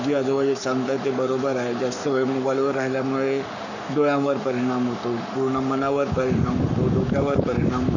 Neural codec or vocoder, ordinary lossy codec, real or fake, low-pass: codec, 44.1 kHz, 7.8 kbps, Pupu-Codec; none; fake; 7.2 kHz